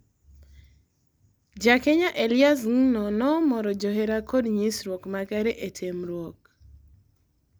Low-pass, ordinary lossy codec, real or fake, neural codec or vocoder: none; none; real; none